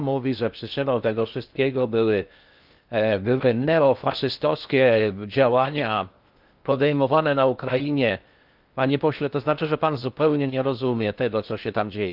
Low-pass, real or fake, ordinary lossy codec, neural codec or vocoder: 5.4 kHz; fake; Opus, 24 kbps; codec, 16 kHz in and 24 kHz out, 0.6 kbps, FocalCodec, streaming, 2048 codes